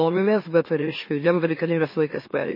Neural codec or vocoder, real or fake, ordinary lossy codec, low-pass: autoencoder, 44.1 kHz, a latent of 192 numbers a frame, MeloTTS; fake; MP3, 24 kbps; 5.4 kHz